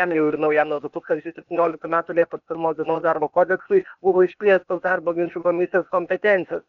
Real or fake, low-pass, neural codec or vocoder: fake; 7.2 kHz; codec, 16 kHz, 0.8 kbps, ZipCodec